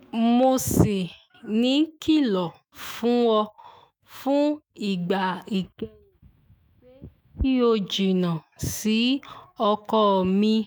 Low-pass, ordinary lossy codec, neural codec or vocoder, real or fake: none; none; autoencoder, 48 kHz, 128 numbers a frame, DAC-VAE, trained on Japanese speech; fake